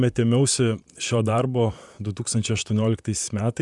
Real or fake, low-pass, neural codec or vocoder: fake; 10.8 kHz; vocoder, 44.1 kHz, 128 mel bands, Pupu-Vocoder